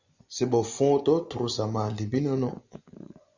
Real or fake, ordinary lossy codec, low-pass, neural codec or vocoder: fake; Opus, 64 kbps; 7.2 kHz; vocoder, 24 kHz, 100 mel bands, Vocos